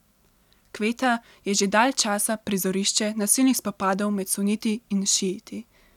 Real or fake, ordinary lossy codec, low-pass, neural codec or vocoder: real; none; 19.8 kHz; none